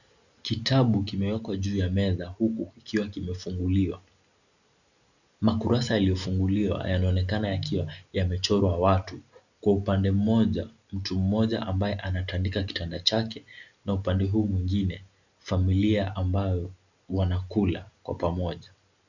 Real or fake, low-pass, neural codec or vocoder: real; 7.2 kHz; none